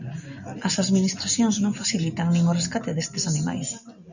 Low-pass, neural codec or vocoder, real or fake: 7.2 kHz; none; real